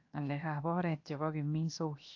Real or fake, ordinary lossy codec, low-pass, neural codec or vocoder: fake; AAC, 48 kbps; 7.2 kHz; codec, 16 kHz, 0.7 kbps, FocalCodec